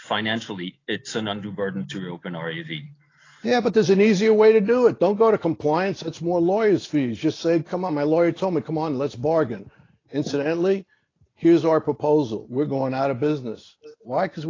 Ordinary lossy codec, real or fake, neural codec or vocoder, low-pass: AAC, 32 kbps; real; none; 7.2 kHz